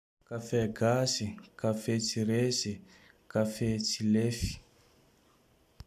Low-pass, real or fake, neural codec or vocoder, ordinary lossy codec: 14.4 kHz; real; none; none